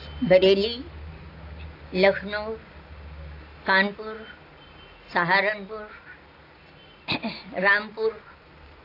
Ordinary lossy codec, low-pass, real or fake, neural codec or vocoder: AAC, 32 kbps; 5.4 kHz; real; none